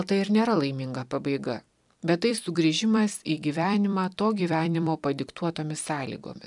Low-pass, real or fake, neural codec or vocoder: 10.8 kHz; fake; vocoder, 44.1 kHz, 128 mel bands every 256 samples, BigVGAN v2